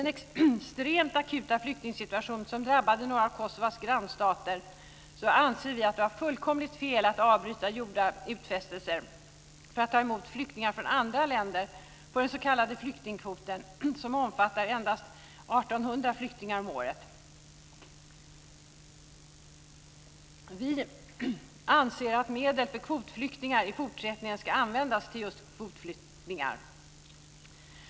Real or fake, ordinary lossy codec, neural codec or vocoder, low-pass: real; none; none; none